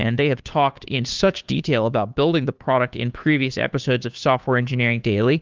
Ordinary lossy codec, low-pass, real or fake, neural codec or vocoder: Opus, 24 kbps; 7.2 kHz; fake; codec, 16 kHz, 2 kbps, FunCodec, trained on Chinese and English, 25 frames a second